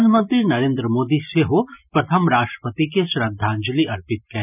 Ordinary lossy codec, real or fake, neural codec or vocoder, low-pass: none; real; none; 3.6 kHz